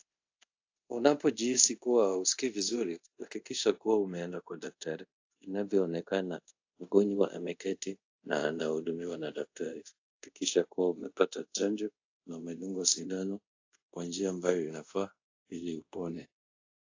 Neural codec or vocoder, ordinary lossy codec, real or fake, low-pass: codec, 24 kHz, 0.5 kbps, DualCodec; AAC, 48 kbps; fake; 7.2 kHz